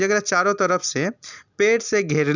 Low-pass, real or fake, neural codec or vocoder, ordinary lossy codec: 7.2 kHz; real; none; none